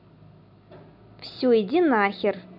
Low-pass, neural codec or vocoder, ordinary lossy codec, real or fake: 5.4 kHz; none; none; real